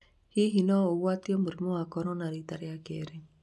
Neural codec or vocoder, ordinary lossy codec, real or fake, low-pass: none; none; real; none